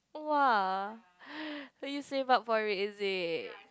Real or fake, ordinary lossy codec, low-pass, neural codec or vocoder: real; none; none; none